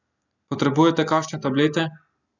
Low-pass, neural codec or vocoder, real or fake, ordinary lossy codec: 7.2 kHz; none; real; none